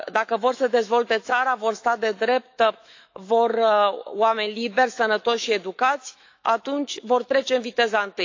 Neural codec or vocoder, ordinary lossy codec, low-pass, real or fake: autoencoder, 48 kHz, 128 numbers a frame, DAC-VAE, trained on Japanese speech; AAC, 48 kbps; 7.2 kHz; fake